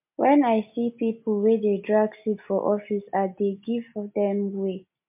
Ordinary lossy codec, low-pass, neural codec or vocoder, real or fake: MP3, 32 kbps; 3.6 kHz; none; real